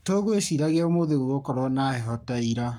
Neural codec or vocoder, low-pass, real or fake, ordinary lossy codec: codec, 44.1 kHz, 7.8 kbps, Pupu-Codec; 19.8 kHz; fake; none